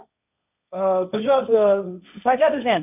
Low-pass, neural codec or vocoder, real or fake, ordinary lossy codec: 3.6 kHz; codec, 16 kHz, 1.1 kbps, Voila-Tokenizer; fake; none